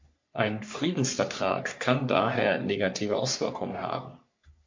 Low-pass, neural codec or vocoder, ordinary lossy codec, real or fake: 7.2 kHz; codec, 44.1 kHz, 3.4 kbps, Pupu-Codec; MP3, 48 kbps; fake